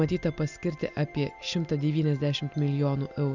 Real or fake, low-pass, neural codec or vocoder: real; 7.2 kHz; none